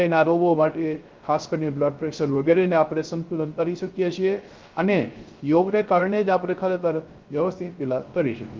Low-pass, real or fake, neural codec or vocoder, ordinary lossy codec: 7.2 kHz; fake; codec, 16 kHz, 0.3 kbps, FocalCodec; Opus, 24 kbps